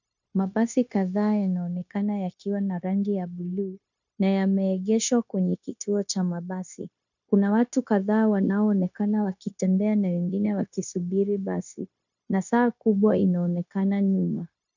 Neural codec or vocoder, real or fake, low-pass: codec, 16 kHz, 0.9 kbps, LongCat-Audio-Codec; fake; 7.2 kHz